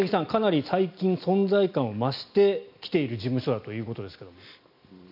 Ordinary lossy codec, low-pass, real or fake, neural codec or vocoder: none; 5.4 kHz; real; none